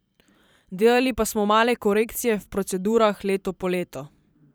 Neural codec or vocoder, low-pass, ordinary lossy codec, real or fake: vocoder, 44.1 kHz, 128 mel bands every 512 samples, BigVGAN v2; none; none; fake